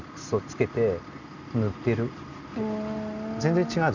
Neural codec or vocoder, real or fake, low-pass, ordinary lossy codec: none; real; 7.2 kHz; none